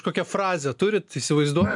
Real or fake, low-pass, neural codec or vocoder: real; 10.8 kHz; none